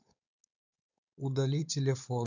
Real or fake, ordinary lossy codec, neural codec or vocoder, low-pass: fake; none; codec, 16 kHz, 4.8 kbps, FACodec; 7.2 kHz